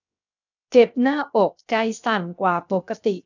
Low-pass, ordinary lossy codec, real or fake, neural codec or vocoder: 7.2 kHz; none; fake; codec, 16 kHz, 0.7 kbps, FocalCodec